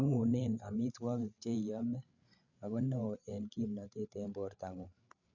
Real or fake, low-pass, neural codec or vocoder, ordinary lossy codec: fake; 7.2 kHz; codec, 16 kHz, 8 kbps, FreqCodec, larger model; none